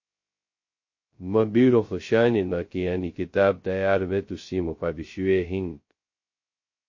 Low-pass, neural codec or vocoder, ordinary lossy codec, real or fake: 7.2 kHz; codec, 16 kHz, 0.2 kbps, FocalCodec; MP3, 32 kbps; fake